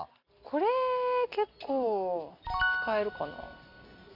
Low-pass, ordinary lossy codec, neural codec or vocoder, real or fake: 5.4 kHz; none; none; real